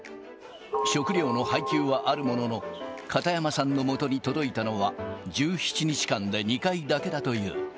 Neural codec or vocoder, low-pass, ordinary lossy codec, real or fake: none; none; none; real